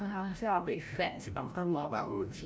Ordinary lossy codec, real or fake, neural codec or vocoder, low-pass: none; fake; codec, 16 kHz, 0.5 kbps, FreqCodec, larger model; none